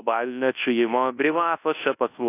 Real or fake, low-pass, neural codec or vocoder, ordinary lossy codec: fake; 3.6 kHz; codec, 24 kHz, 0.9 kbps, WavTokenizer, large speech release; AAC, 24 kbps